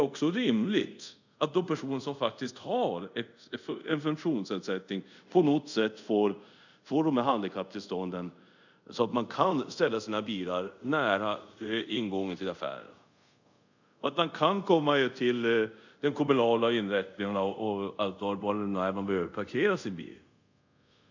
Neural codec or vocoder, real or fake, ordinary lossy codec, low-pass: codec, 24 kHz, 0.5 kbps, DualCodec; fake; none; 7.2 kHz